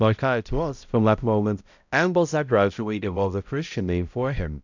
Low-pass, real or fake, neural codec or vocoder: 7.2 kHz; fake; codec, 16 kHz, 0.5 kbps, X-Codec, HuBERT features, trained on balanced general audio